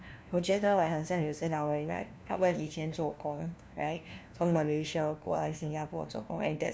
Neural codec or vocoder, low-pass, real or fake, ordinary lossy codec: codec, 16 kHz, 1 kbps, FunCodec, trained on LibriTTS, 50 frames a second; none; fake; none